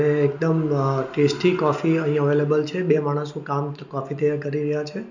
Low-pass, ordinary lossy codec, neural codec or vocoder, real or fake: 7.2 kHz; none; none; real